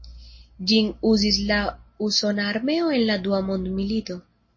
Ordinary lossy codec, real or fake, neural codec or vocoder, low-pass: MP3, 32 kbps; real; none; 7.2 kHz